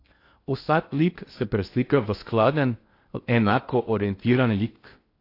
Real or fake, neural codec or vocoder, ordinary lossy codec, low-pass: fake; codec, 16 kHz in and 24 kHz out, 0.6 kbps, FocalCodec, streaming, 2048 codes; AAC, 32 kbps; 5.4 kHz